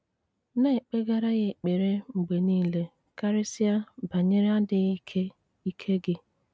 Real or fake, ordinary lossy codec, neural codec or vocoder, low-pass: real; none; none; none